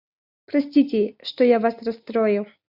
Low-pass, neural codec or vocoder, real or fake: 5.4 kHz; none; real